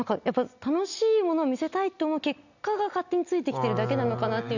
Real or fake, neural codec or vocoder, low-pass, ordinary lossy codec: real; none; 7.2 kHz; none